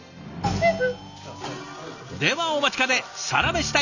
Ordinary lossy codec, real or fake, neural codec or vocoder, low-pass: none; real; none; 7.2 kHz